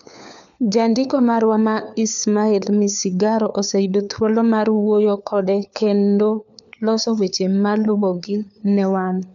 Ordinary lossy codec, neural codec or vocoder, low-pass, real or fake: none; codec, 16 kHz, 4 kbps, FunCodec, trained on LibriTTS, 50 frames a second; 7.2 kHz; fake